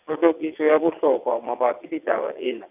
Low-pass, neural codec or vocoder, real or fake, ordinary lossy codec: 3.6 kHz; vocoder, 22.05 kHz, 80 mel bands, WaveNeXt; fake; none